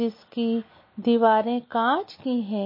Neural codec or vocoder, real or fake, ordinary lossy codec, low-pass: none; real; MP3, 24 kbps; 5.4 kHz